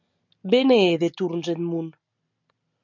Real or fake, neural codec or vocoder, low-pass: real; none; 7.2 kHz